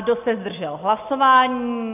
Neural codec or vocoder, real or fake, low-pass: none; real; 3.6 kHz